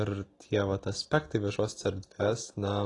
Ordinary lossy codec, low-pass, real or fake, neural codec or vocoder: AAC, 32 kbps; 10.8 kHz; real; none